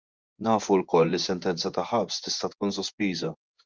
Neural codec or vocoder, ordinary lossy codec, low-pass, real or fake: vocoder, 24 kHz, 100 mel bands, Vocos; Opus, 32 kbps; 7.2 kHz; fake